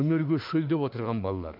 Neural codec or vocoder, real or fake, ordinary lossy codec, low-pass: autoencoder, 48 kHz, 32 numbers a frame, DAC-VAE, trained on Japanese speech; fake; AAC, 48 kbps; 5.4 kHz